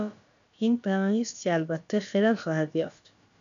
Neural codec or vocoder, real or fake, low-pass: codec, 16 kHz, about 1 kbps, DyCAST, with the encoder's durations; fake; 7.2 kHz